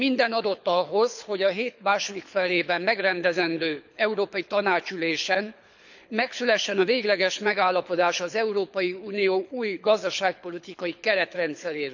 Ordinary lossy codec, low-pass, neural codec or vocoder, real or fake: none; 7.2 kHz; codec, 24 kHz, 6 kbps, HILCodec; fake